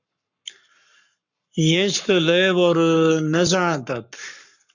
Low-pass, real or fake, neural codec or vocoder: 7.2 kHz; fake; codec, 44.1 kHz, 7.8 kbps, Pupu-Codec